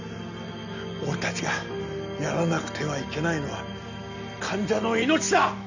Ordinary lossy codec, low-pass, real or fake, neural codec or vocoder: none; 7.2 kHz; real; none